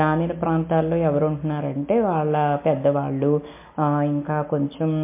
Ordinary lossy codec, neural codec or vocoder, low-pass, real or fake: MP3, 24 kbps; none; 3.6 kHz; real